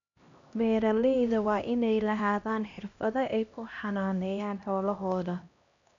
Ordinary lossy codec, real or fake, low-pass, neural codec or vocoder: none; fake; 7.2 kHz; codec, 16 kHz, 1 kbps, X-Codec, HuBERT features, trained on LibriSpeech